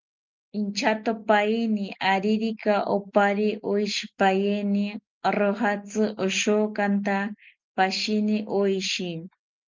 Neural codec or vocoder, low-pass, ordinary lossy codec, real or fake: none; 7.2 kHz; Opus, 24 kbps; real